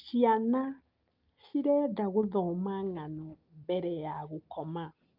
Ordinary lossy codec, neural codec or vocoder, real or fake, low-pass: Opus, 24 kbps; none; real; 5.4 kHz